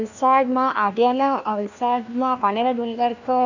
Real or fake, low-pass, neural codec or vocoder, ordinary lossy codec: fake; 7.2 kHz; codec, 16 kHz, 1 kbps, FunCodec, trained on LibriTTS, 50 frames a second; none